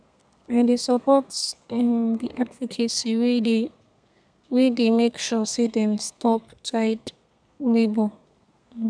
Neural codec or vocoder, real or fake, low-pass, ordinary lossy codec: codec, 32 kHz, 1.9 kbps, SNAC; fake; 9.9 kHz; none